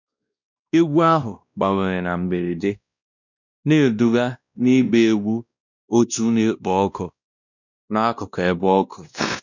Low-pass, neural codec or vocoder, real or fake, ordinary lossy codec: 7.2 kHz; codec, 16 kHz, 1 kbps, X-Codec, WavLM features, trained on Multilingual LibriSpeech; fake; none